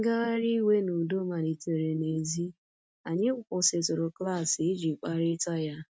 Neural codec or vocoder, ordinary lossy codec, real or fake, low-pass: none; none; real; none